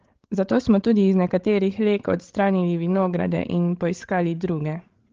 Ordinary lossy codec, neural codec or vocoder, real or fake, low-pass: Opus, 16 kbps; codec, 16 kHz, 16 kbps, FreqCodec, larger model; fake; 7.2 kHz